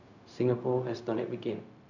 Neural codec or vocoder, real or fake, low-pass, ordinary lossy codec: codec, 16 kHz, 0.4 kbps, LongCat-Audio-Codec; fake; 7.2 kHz; none